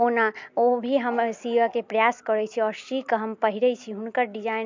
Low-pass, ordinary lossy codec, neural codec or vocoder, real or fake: 7.2 kHz; MP3, 64 kbps; none; real